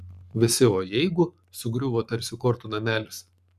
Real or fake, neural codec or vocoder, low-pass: fake; codec, 44.1 kHz, 7.8 kbps, Pupu-Codec; 14.4 kHz